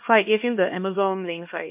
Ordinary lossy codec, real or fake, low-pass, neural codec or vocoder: MP3, 32 kbps; fake; 3.6 kHz; codec, 16 kHz, 1 kbps, X-Codec, HuBERT features, trained on LibriSpeech